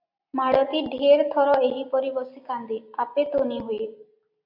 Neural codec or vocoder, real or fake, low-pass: none; real; 5.4 kHz